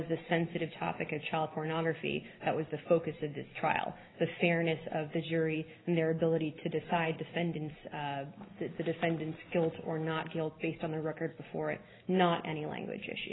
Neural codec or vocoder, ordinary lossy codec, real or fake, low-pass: none; AAC, 16 kbps; real; 7.2 kHz